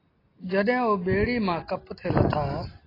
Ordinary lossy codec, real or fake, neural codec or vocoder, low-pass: AAC, 24 kbps; real; none; 5.4 kHz